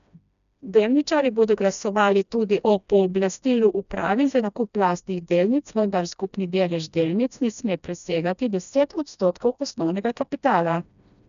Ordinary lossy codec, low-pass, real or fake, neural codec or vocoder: none; 7.2 kHz; fake; codec, 16 kHz, 1 kbps, FreqCodec, smaller model